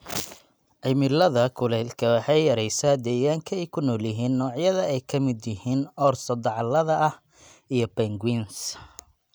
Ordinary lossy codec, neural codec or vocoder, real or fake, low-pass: none; none; real; none